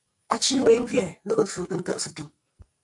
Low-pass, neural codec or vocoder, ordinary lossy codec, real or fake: 10.8 kHz; codec, 44.1 kHz, 2.6 kbps, SNAC; MP3, 64 kbps; fake